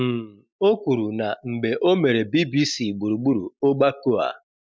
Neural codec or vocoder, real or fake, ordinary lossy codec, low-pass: none; real; none; none